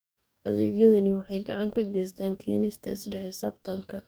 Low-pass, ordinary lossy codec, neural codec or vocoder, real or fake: none; none; codec, 44.1 kHz, 2.6 kbps, DAC; fake